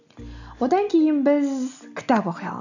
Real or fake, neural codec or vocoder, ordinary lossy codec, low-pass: real; none; none; 7.2 kHz